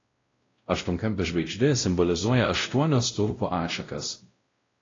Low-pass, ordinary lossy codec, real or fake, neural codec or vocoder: 7.2 kHz; AAC, 32 kbps; fake; codec, 16 kHz, 0.5 kbps, X-Codec, WavLM features, trained on Multilingual LibriSpeech